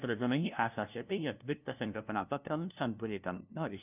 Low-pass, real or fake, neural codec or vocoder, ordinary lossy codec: 3.6 kHz; fake; codec, 16 kHz, 0.5 kbps, FunCodec, trained on LibriTTS, 25 frames a second; none